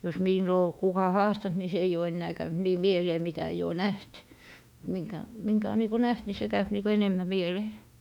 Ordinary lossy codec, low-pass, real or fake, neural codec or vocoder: none; 19.8 kHz; fake; autoencoder, 48 kHz, 32 numbers a frame, DAC-VAE, trained on Japanese speech